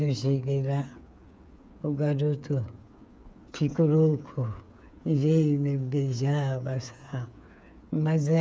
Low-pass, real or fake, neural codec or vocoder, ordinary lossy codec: none; fake; codec, 16 kHz, 8 kbps, FreqCodec, smaller model; none